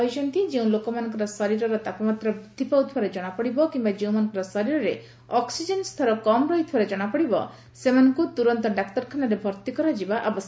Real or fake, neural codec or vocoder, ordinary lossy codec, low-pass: real; none; none; none